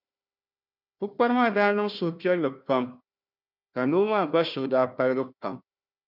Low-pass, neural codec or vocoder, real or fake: 5.4 kHz; codec, 16 kHz, 1 kbps, FunCodec, trained on Chinese and English, 50 frames a second; fake